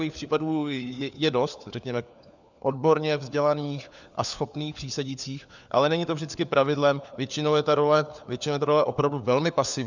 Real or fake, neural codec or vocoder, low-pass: fake; codec, 16 kHz, 4 kbps, FunCodec, trained on LibriTTS, 50 frames a second; 7.2 kHz